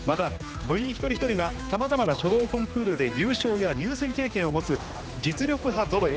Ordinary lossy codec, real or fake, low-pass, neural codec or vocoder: none; fake; none; codec, 16 kHz, 2 kbps, X-Codec, HuBERT features, trained on general audio